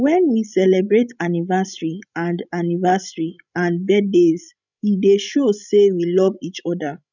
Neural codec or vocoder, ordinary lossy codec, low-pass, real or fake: codec, 16 kHz, 16 kbps, FreqCodec, larger model; none; 7.2 kHz; fake